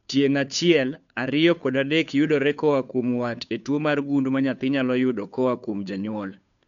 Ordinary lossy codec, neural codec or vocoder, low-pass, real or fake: none; codec, 16 kHz, 2 kbps, FunCodec, trained on Chinese and English, 25 frames a second; 7.2 kHz; fake